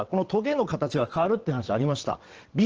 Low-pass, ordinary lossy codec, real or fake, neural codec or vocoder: 7.2 kHz; Opus, 16 kbps; fake; vocoder, 22.05 kHz, 80 mel bands, WaveNeXt